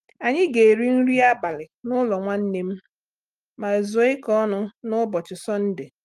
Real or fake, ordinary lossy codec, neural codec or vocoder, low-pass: real; Opus, 32 kbps; none; 14.4 kHz